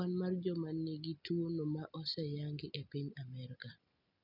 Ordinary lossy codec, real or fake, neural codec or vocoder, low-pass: none; real; none; 5.4 kHz